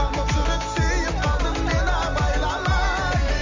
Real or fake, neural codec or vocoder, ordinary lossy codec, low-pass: real; none; Opus, 32 kbps; 7.2 kHz